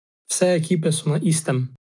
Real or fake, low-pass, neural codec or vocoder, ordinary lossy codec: real; 10.8 kHz; none; AAC, 64 kbps